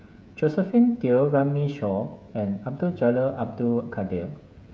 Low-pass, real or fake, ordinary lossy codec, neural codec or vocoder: none; fake; none; codec, 16 kHz, 16 kbps, FreqCodec, smaller model